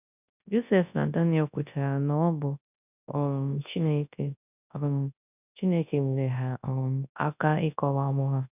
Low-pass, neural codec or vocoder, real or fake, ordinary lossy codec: 3.6 kHz; codec, 24 kHz, 0.9 kbps, WavTokenizer, large speech release; fake; none